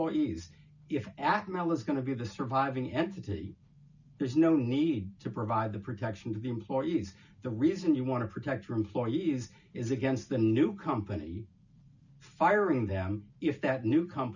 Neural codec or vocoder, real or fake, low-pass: none; real; 7.2 kHz